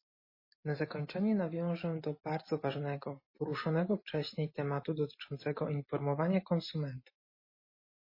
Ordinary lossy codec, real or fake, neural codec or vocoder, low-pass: MP3, 24 kbps; real; none; 5.4 kHz